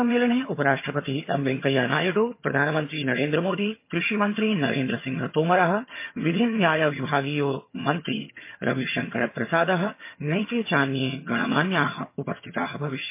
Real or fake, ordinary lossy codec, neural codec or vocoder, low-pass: fake; MP3, 24 kbps; vocoder, 22.05 kHz, 80 mel bands, HiFi-GAN; 3.6 kHz